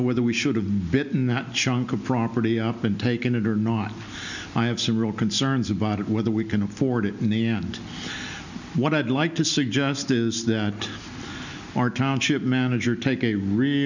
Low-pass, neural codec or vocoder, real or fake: 7.2 kHz; none; real